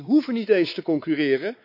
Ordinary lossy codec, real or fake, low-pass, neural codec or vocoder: none; fake; 5.4 kHz; codec, 16 kHz, 4 kbps, X-Codec, WavLM features, trained on Multilingual LibriSpeech